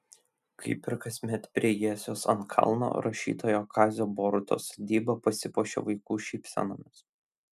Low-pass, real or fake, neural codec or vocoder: 14.4 kHz; real; none